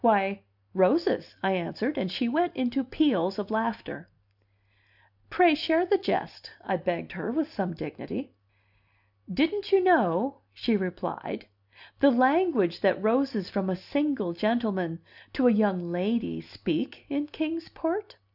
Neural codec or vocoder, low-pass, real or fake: none; 5.4 kHz; real